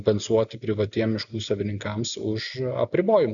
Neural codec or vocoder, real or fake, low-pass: none; real; 7.2 kHz